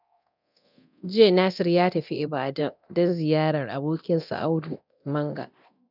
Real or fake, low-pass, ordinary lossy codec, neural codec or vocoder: fake; 5.4 kHz; none; codec, 24 kHz, 0.9 kbps, DualCodec